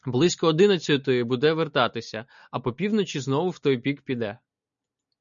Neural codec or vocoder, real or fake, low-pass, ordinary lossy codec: none; real; 7.2 kHz; MP3, 96 kbps